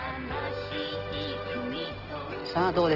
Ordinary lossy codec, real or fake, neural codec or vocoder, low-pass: Opus, 16 kbps; real; none; 5.4 kHz